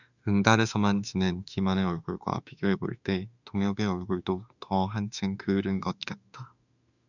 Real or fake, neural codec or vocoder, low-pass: fake; autoencoder, 48 kHz, 32 numbers a frame, DAC-VAE, trained on Japanese speech; 7.2 kHz